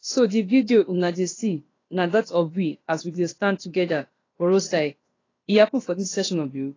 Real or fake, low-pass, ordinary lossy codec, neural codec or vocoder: fake; 7.2 kHz; AAC, 32 kbps; codec, 16 kHz, about 1 kbps, DyCAST, with the encoder's durations